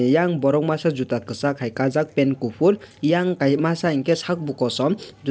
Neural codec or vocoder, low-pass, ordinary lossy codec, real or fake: none; none; none; real